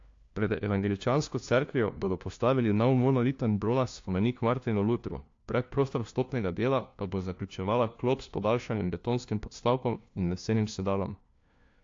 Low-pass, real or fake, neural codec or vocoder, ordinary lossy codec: 7.2 kHz; fake; codec, 16 kHz, 1 kbps, FunCodec, trained on LibriTTS, 50 frames a second; AAC, 48 kbps